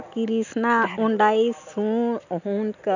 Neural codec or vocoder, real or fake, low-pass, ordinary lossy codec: none; real; 7.2 kHz; none